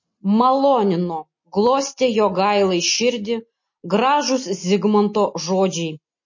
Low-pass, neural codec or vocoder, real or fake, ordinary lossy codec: 7.2 kHz; none; real; MP3, 32 kbps